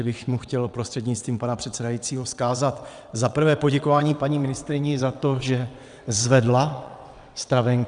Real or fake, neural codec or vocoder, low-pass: fake; vocoder, 22.05 kHz, 80 mel bands, WaveNeXt; 9.9 kHz